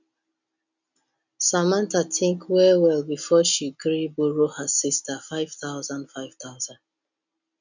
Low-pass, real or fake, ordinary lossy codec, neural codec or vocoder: 7.2 kHz; real; none; none